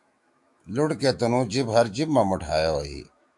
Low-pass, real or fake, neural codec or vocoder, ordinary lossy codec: 10.8 kHz; fake; autoencoder, 48 kHz, 128 numbers a frame, DAC-VAE, trained on Japanese speech; AAC, 64 kbps